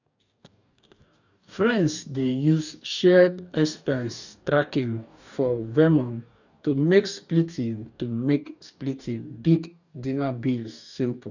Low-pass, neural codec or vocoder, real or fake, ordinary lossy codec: 7.2 kHz; codec, 44.1 kHz, 2.6 kbps, DAC; fake; none